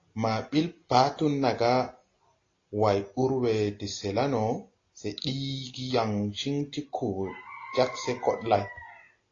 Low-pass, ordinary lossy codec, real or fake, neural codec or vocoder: 7.2 kHz; AAC, 32 kbps; real; none